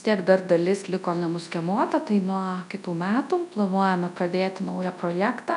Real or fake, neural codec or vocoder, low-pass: fake; codec, 24 kHz, 0.9 kbps, WavTokenizer, large speech release; 10.8 kHz